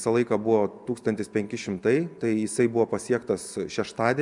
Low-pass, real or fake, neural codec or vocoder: 10.8 kHz; real; none